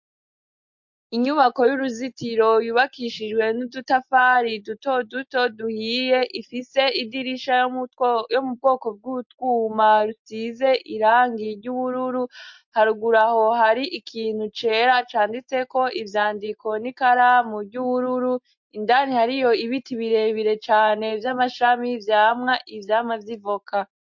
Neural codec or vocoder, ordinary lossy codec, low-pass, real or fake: none; MP3, 48 kbps; 7.2 kHz; real